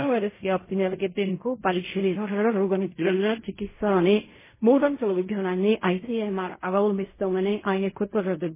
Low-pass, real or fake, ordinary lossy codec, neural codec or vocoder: 3.6 kHz; fake; MP3, 16 kbps; codec, 16 kHz in and 24 kHz out, 0.4 kbps, LongCat-Audio-Codec, fine tuned four codebook decoder